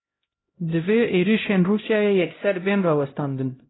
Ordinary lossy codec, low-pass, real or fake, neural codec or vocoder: AAC, 16 kbps; 7.2 kHz; fake; codec, 16 kHz, 0.5 kbps, X-Codec, HuBERT features, trained on LibriSpeech